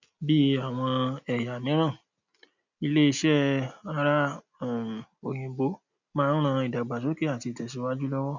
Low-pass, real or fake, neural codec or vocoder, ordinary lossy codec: 7.2 kHz; real; none; none